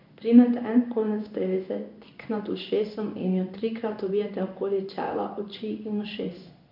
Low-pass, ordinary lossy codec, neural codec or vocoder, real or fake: 5.4 kHz; MP3, 48 kbps; codec, 16 kHz in and 24 kHz out, 1 kbps, XY-Tokenizer; fake